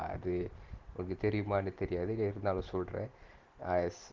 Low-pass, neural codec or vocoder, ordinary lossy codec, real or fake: 7.2 kHz; none; Opus, 16 kbps; real